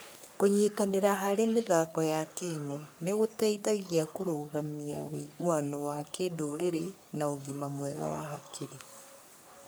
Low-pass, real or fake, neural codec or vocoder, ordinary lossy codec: none; fake; codec, 44.1 kHz, 3.4 kbps, Pupu-Codec; none